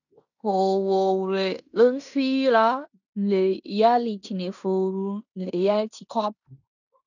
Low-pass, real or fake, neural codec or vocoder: 7.2 kHz; fake; codec, 16 kHz in and 24 kHz out, 0.9 kbps, LongCat-Audio-Codec, fine tuned four codebook decoder